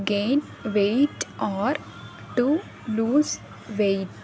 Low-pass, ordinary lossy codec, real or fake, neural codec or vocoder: none; none; real; none